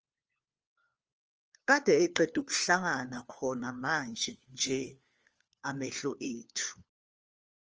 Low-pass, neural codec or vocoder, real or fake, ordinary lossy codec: 7.2 kHz; codec, 16 kHz, 4 kbps, FunCodec, trained on LibriTTS, 50 frames a second; fake; Opus, 24 kbps